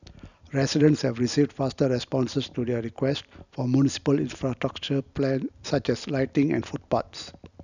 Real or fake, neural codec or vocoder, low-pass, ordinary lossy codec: real; none; 7.2 kHz; none